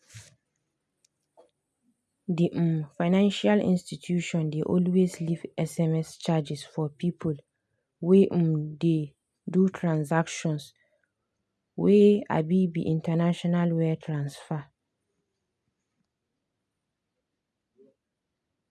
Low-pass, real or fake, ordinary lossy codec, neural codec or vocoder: none; real; none; none